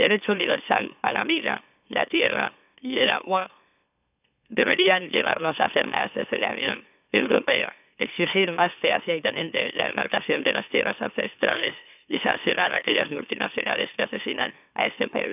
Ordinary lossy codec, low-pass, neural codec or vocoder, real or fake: none; 3.6 kHz; autoencoder, 44.1 kHz, a latent of 192 numbers a frame, MeloTTS; fake